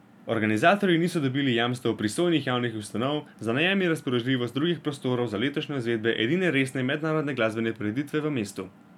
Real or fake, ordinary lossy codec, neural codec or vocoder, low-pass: real; none; none; 19.8 kHz